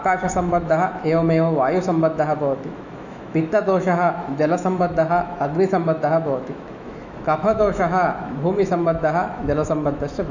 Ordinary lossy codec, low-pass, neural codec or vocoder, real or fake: none; 7.2 kHz; autoencoder, 48 kHz, 128 numbers a frame, DAC-VAE, trained on Japanese speech; fake